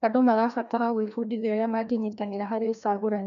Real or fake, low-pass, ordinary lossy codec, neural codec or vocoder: fake; 7.2 kHz; none; codec, 16 kHz, 1 kbps, FreqCodec, larger model